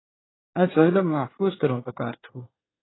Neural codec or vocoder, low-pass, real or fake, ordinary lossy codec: codec, 24 kHz, 1 kbps, SNAC; 7.2 kHz; fake; AAC, 16 kbps